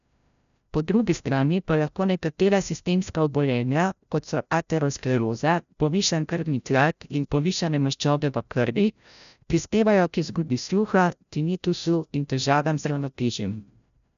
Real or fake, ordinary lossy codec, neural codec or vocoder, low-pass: fake; none; codec, 16 kHz, 0.5 kbps, FreqCodec, larger model; 7.2 kHz